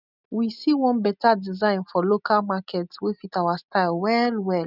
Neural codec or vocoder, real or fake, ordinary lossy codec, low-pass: none; real; none; 5.4 kHz